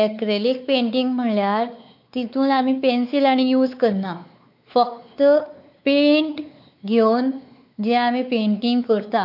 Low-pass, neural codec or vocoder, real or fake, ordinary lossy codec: 5.4 kHz; codec, 16 kHz, 4 kbps, X-Codec, WavLM features, trained on Multilingual LibriSpeech; fake; none